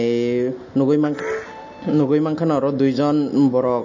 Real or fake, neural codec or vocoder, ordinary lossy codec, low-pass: real; none; MP3, 32 kbps; 7.2 kHz